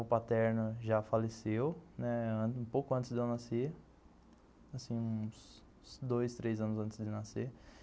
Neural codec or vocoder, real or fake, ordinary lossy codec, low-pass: none; real; none; none